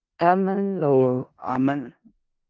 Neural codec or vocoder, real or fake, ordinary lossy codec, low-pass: codec, 16 kHz in and 24 kHz out, 0.4 kbps, LongCat-Audio-Codec, four codebook decoder; fake; Opus, 24 kbps; 7.2 kHz